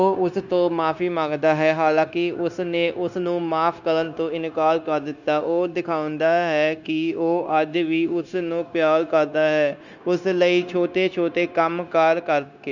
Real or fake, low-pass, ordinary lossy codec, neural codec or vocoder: fake; 7.2 kHz; none; codec, 16 kHz, 0.9 kbps, LongCat-Audio-Codec